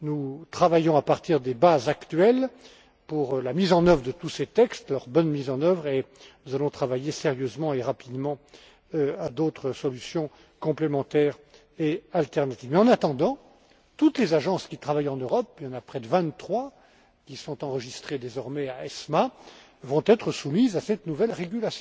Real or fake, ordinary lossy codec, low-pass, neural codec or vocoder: real; none; none; none